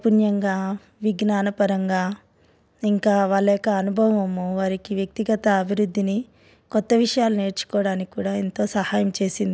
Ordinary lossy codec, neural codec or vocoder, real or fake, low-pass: none; none; real; none